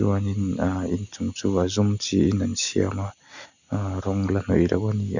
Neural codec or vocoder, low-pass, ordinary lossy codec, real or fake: none; 7.2 kHz; none; real